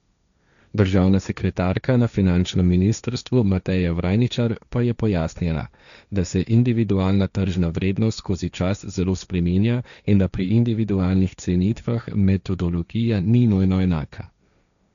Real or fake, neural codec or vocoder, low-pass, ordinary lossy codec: fake; codec, 16 kHz, 1.1 kbps, Voila-Tokenizer; 7.2 kHz; none